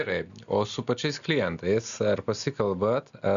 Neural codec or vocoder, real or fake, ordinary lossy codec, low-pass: none; real; MP3, 96 kbps; 7.2 kHz